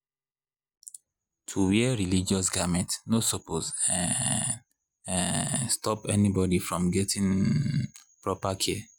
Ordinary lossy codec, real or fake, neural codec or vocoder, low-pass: none; real; none; none